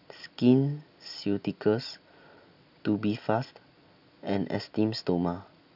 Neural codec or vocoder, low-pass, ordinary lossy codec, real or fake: none; 5.4 kHz; none; real